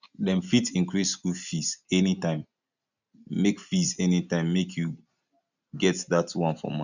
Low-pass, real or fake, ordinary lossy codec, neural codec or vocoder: 7.2 kHz; real; none; none